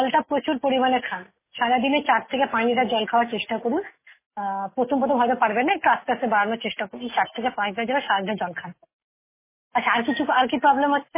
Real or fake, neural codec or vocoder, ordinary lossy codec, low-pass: real; none; MP3, 16 kbps; 3.6 kHz